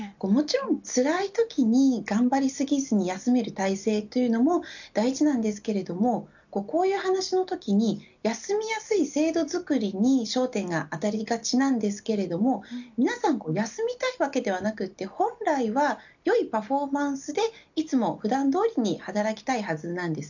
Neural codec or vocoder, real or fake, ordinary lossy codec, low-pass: none; real; none; 7.2 kHz